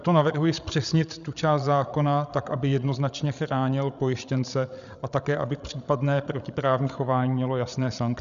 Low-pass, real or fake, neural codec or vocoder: 7.2 kHz; fake; codec, 16 kHz, 16 kbps, FreqCodec, larger model